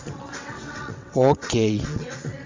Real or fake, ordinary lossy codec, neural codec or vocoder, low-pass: fake; MP3, 48 kbps; vocoder, 22.05 kHz, 80 mel bands, WaveNeXt; 7.2 kHz